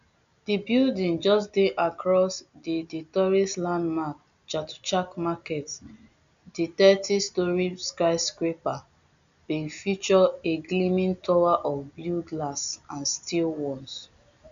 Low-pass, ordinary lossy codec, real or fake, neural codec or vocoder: 7.2 kHz; AAC, 96 kbps; real; none